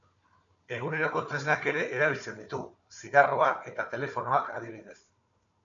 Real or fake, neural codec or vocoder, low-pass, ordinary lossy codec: fake; codec, 16 kHz, 4 kbps, FunCodec, trained on Chinese and English, 50 frames a second; 7.2 kHz; MP3, 64 kbps